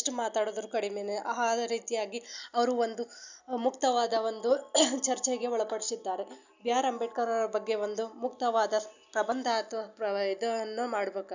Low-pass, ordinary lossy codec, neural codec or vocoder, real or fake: 7.2 kHz; none; none; real